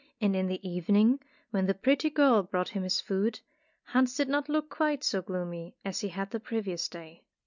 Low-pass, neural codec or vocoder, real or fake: 7.2 kHz; none; real